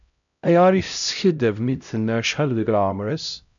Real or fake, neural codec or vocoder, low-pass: fake; codec, 16 kHz, 0.5 kbps, X-Codec, HuBERT features, trained on LibriSpeech; 7.2 kHz